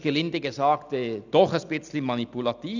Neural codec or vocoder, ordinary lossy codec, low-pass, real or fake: none; none; 7.2 kHz; real